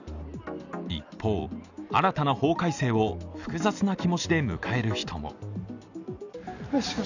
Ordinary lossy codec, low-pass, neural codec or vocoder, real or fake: none; 7.2 kHz; none; real